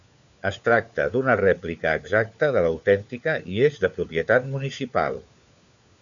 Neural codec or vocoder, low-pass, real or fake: codec, 16 kHz, 4 kbps, FunCodec, trained on LibriTTS, 50 frames a second; 7.2 kHz; fake